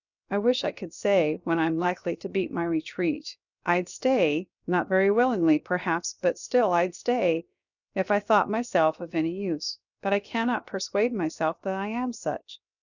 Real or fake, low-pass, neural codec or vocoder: fake; 7.2 kHz; codec, 16 kHz, 0.7 kbps, FocalCodec